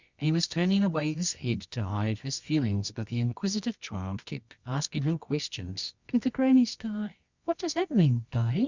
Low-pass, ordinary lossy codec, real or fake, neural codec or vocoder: 7.2 kHz; Opus, 64 kbps; fake; codec, 24 kHz, 0.9 kbps, WavTokenizer, medium music audio release